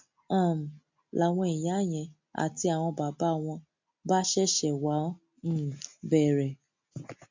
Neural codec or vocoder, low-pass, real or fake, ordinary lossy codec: none; 7.2 kHz; real; MP3, 48 kbps